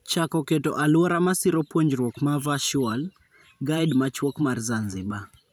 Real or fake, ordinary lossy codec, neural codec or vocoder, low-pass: fake; none; vocoder, 44.1 kHz, 128 mel bands every 512 samples, BigVGAN v2; none